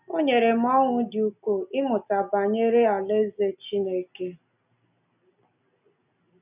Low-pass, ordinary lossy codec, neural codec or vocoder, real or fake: 3.6 kHz; none; none; real